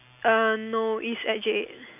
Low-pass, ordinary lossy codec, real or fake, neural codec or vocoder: 3.6 kHz; none; real; none